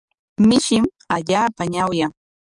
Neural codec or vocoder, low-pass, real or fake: codec, 44.1 kHz, 7.8 kbps, DAC; 10.8 kHz; fake